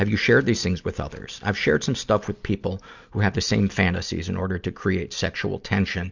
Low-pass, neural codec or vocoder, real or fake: 7.2 kHz; none; real